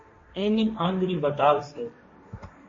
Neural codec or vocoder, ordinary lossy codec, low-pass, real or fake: codec, 16 kHz, 1.1 kbps, Voila-Tokenizer; MP3, 32 kbps; 7.2 kHz; fake